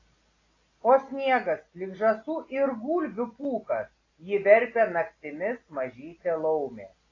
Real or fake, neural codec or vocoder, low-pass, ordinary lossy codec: real; none; 7.2 kHz; AAC, 32 kbps